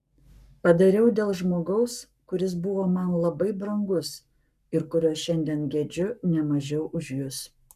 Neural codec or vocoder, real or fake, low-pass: codec, 44.1 kHz, 7.8 kbps, Pupu-Codec; fake; 14.4 kHz